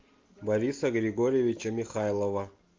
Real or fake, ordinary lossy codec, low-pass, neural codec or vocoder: real; Opus, 24 kbps; 7.2 kHz; none